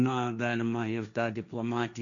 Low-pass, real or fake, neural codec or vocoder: 7.2 kHz; fake; codec, 16 kHz, 1.1 kbps, Voila-Tokenizer